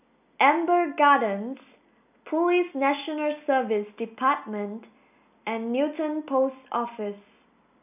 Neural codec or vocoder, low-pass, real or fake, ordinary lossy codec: none; 3.6 kHz; real; none